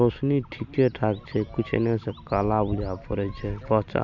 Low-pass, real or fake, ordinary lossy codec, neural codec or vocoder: 7.2 kHz; real; MP3, 64 kbps; none